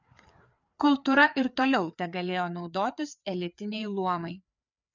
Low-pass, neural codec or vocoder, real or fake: 7.2 kHz; codec, 16 kHz, 4 kbps, FreqCodec, larger model; fake